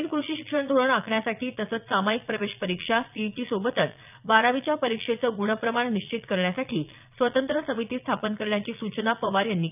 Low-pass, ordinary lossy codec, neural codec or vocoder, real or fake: 3.6 kHz; none; vocoder, 22.05 kHz, 80 mel bands, Vocos; fake